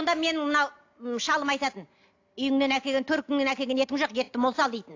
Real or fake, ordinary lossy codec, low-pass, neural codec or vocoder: real; AAC, 48 kbps; 7.2 kHz; none